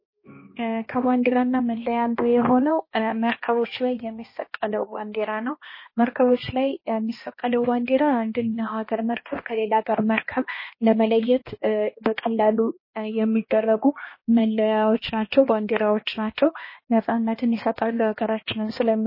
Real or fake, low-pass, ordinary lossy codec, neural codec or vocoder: fake; 5.4 kHz; MP3, 24 kbps; codec, 16 kHz, 1 kbps, X-Codec, HuBERT features, trained on balanced general audio